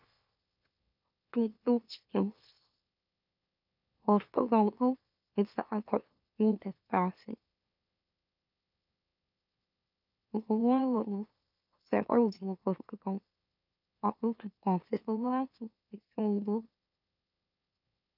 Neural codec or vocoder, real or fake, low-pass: autoencoder, 44.1 kHz, a latent of 192 numbers a frame, MeloTTS; fake; 5.4 kHz